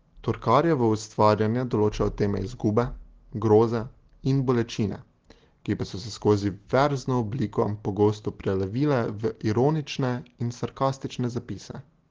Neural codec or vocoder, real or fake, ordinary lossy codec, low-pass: none; real; Opus, 16 kbps; 7.2 kHz